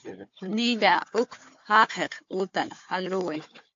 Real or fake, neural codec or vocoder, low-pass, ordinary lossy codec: fake; codec, 16 kHz, 4 kbps, FunCodec, trained on Chinese and English, 50 frames a second; 7.2 kHz; AAC, 48 kbps